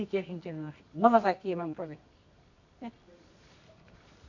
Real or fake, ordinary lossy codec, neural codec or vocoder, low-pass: fake; none; codec, 24 kHz, 0.9 kbps, WavTokenizer, medium music audio release; 7.2 kHz